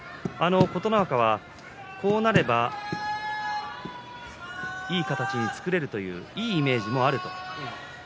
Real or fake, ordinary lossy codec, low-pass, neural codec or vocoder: real; none; none; none